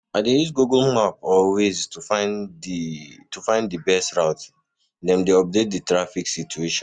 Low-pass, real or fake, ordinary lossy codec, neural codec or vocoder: 9.9 kHz; real; MP3, 96 kbps; none